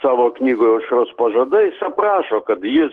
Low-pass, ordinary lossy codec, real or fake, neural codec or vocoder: 10.8 kHz; Opus, 16 kbps; real; none